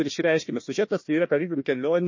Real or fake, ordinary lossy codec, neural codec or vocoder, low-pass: fake; MP3, 32 kbps; codec, 16 kHz, 1 kbps, FunCodec, trained on Chinese and English, 50 frames a second; 7.2 kHz